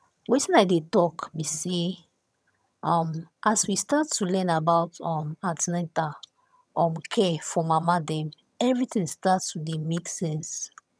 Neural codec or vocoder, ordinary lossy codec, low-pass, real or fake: vocoder, 22.05 kHz, 80 mel bands, HiFi-GAN; none; none; fake